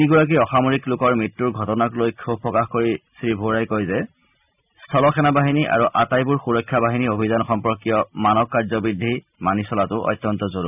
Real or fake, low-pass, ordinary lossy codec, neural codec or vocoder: real; 3.6 kHz; none; none